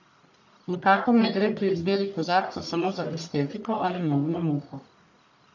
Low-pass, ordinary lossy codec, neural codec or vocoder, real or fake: 7.2 kHz; none; codec, 44.1 kHz, 1.7 kbps, Pupu-Codec; fake